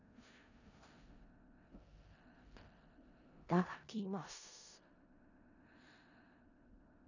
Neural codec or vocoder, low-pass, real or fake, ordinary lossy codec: codec, 16 kHz in and 24 kHz out, 0.4 kbps, LongCat-Audio-Codec, four codebook decoder; 7.2 kHz; fake; none